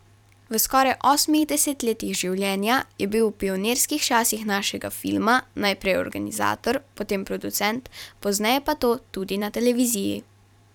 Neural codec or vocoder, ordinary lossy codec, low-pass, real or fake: vocoder, 44.1 kHz, 128 mel bands every 512 samples, BigVGAN v2; none; 19.8 kHz; fake